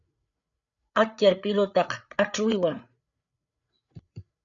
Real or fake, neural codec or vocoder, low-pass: fake; codec, 16 kHz, 8 kbps, FreqCodec, larger model; 7.2 kHz